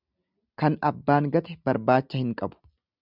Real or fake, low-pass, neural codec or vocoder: real; 5.4 kHz; none